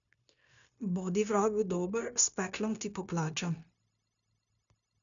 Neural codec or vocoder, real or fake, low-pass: codec, 16 kHz, 0.9 kbps, LongCat-Audio-Codec; fake; 7.2 kHz